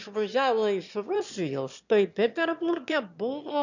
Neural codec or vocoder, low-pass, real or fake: autoencoder, 22.05 kHz, a latent of 192 numbers a frame, VITS, trained on one speaker; 7.2 kHz; fake